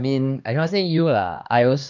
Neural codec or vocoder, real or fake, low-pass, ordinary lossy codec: codec, 16 kHz, 2 kbps, X-Codec, HuBERT features, trained on LibriSpeech; fake; 7.2 kHz; none